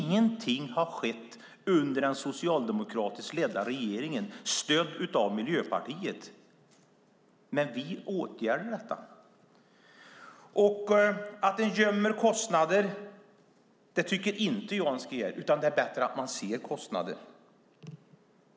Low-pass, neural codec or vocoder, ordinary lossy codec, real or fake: none; none; none; real